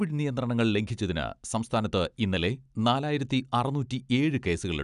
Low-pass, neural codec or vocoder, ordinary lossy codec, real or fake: 10.8 kHz; none; none; real